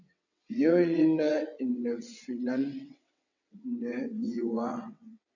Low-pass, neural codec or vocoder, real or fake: 7.2 kHz; vocoder, 22.05 kHz, 80 mel bands, WaveNeXt; fake